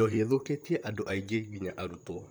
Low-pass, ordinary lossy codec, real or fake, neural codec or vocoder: none; none; fake; vocoder, 44.1 kHz, 128 mel bands, Pupu-Vocoder